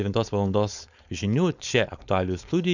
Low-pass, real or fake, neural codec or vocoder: 7.2 kHz; fake; codec, 16 kHz, 4.8 kbps, FACodec